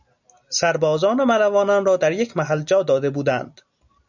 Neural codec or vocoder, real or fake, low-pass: none; real; 7.2 kHz